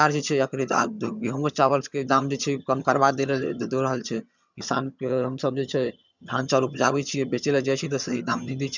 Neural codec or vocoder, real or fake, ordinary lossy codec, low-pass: vocoder, 22.05 kHz, 80 mel bands, HiFi-GAN; fake; none; 7.2 kHz